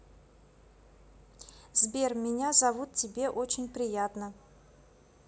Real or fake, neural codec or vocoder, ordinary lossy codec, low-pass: real; none; none; none